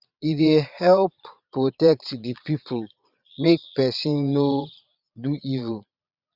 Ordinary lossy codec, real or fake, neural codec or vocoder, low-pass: Opus, 64 kbps; fake; vocoder, 22.05 kHz, 80 mel bands, Vocos; 5.4 kHz